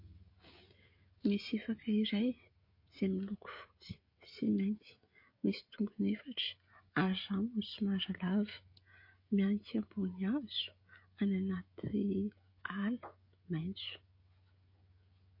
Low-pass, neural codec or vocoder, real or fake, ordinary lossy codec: 5.4 kHz; codec, 16 kHz, 16 kbps, FreqCodec, smaller model; fake; MP3, 32 kbps